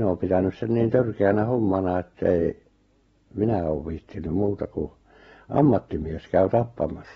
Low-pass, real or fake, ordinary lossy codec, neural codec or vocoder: 19.8 kHz; real; AAC, 24 kbps; none